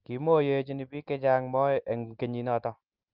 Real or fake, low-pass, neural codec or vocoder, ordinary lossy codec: real; 5.4 kHz; none; Opus, 32 kbps